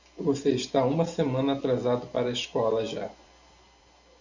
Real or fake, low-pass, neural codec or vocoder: real; 7.2 kHz; none